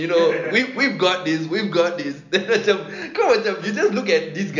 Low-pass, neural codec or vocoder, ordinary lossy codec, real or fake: 7.2 kHz; none; none; real